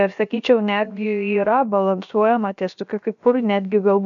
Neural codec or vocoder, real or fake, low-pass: codec, 16 kHz, about 1 kbps, DyCAST, with the encoder's durations; fake; 7.2 kHz